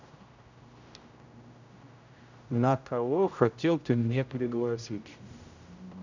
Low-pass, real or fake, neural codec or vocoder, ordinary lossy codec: 7.2 kHz; fake; codec, 16 kHz, 0.5 kbps, X-Codec, HuBERT features, trained on general audio; none